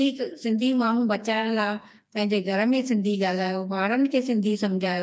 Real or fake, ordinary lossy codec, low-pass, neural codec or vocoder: fake; none; none; codec, 16 kHz, 2 kbps, FreqCodec, smaller model